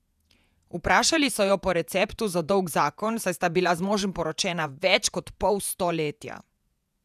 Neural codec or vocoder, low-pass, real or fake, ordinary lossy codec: vocoder, 48 kHz, 128 mel bands, Vocos; 14.4 kHz; fake; none